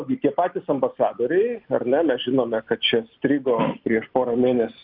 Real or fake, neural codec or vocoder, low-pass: real; none; 5.4 kHz